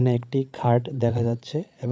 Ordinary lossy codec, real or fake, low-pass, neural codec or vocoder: none; fake; none; codec, 16 kHz, 16 kbps, FreqCodec, larger model